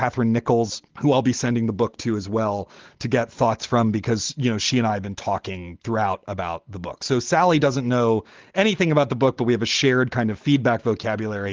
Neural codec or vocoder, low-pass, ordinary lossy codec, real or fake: none; 7.2 kHz; Opus, 16 kbps; real